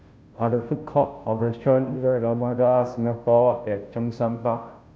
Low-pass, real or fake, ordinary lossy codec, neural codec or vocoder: none; fake; none; codec, 16 kHz, 0.5 kbps, FunCodec, trained on Chinese and English, 25 frames a second